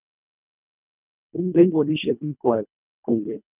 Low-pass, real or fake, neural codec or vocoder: 3.6 kHz; fake; codec, 24 kHz, 1.5 kbps, HILCodec